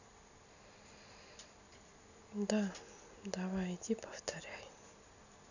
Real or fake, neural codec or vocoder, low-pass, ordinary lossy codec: real; none; 7.2 kHz; none